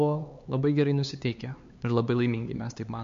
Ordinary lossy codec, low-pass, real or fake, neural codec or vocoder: MP3, 48 kbps; 7.2 kHz; fake; codec, 16 kHz, 4 kbps, X-Codec, HuBERT features, trained on LibriSpeech